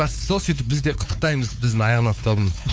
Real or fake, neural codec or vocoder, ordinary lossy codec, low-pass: fake; codec, 16 kHz, 4 kbps, X-Codec, WavLM features, trained on Multilingual LibriSpeech; none; none